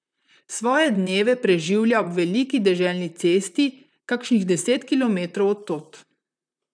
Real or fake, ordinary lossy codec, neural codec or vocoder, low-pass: fake; none; vocoder, 44.1 kHz, 128 mel bands, Pupu-Vocoder; 9.9 kHz